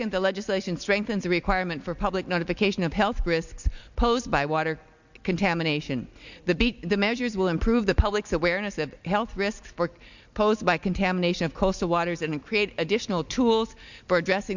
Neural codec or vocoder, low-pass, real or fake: none; 7.2 kHz; real